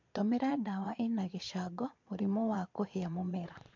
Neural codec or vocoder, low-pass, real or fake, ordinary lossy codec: vocoder, 22.05 kHz, 80 mel bands, Vocos; 7.2 kHz; fake; MP3, 48 kbps